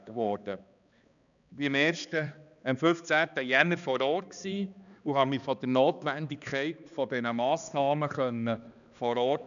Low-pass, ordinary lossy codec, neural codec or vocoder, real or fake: 7.2 kHz; none; codec, 16 kHz, 2 kbps, X-Codec, HuBERT features, trained on balanced general audio; fake